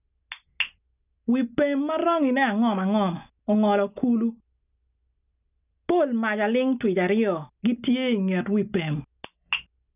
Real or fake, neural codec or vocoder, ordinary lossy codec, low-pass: real; none; none; 3.6 kHz